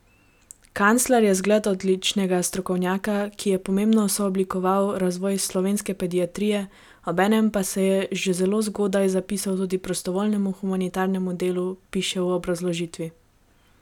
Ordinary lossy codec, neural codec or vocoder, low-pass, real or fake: none; none; 19.8 kHz; real